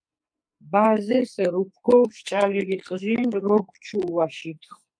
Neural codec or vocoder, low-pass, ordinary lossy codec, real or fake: codec, 44.1 kHz, 2.6 kbps, SNAC; 9.9 kHz; MP3, 96 kbps; fake